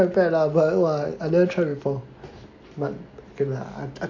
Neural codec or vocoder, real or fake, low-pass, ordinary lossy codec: none; real; 7.2 kHz; AAC, 48 kbps